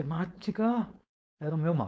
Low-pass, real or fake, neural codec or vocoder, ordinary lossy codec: none; fake; codec, 16 kHz, 4.8 kbps, FACodec; none